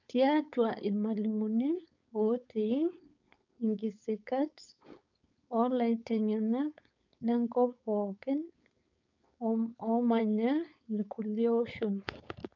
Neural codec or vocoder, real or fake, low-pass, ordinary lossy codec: codec, 16 kHz, 4.8 kbps, FACodec; fake; 7.2 kHz; none